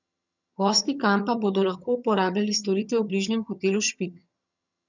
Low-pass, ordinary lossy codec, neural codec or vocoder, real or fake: 7.2 kHz; none; vocoder, 22.05 kHz, 80 mel bands, HiFi-GAN; fake